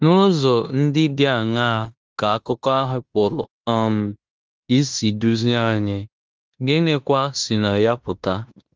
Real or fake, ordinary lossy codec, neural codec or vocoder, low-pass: fake; Opus, 24 kbps; codec, 16 kHz in and 24 kHz out, 0.9 kbps, LongCat-Audio-Codec, fine tuned four codebook decoder; 7.2 kHz